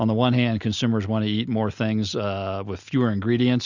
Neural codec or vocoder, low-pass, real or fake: none; 7.2 kHz; real